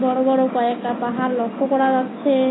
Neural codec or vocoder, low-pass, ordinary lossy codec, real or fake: none; 7.2 kHz; AAC, 16 kbps; real